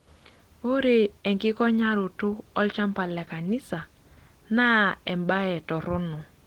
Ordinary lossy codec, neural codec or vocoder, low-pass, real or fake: Opus, 16 kbps; none; 19.8 kHz; real